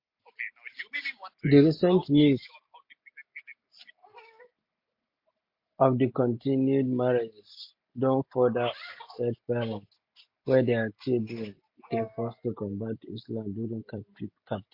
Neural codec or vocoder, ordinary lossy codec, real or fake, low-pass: none; MP3, 32 kbps; real; 5.4 kHz